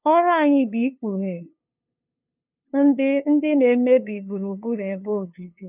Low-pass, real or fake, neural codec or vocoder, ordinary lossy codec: 3.6 kHz; fake; codec, 16 kHz, 2 kbps, FunCodec, trained on LibriTTS, 25 frames a second; none